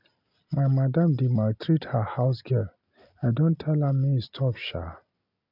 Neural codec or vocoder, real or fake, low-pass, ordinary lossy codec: none; real; 5.4 kHz; none